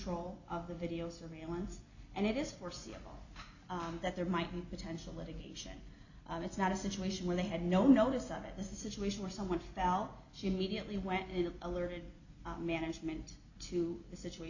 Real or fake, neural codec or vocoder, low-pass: real; none; 7.2 kHz